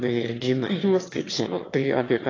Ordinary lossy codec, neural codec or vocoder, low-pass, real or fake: AAC, 32 kbps; autoencoder, 22.05 kHz, a latent of 192 numbers a frame, VITS, trained on one speaker; 7.2 kHz; fake